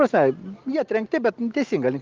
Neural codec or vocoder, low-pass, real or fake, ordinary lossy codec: none; 7.2 kHz; real; Opus, 24 kbps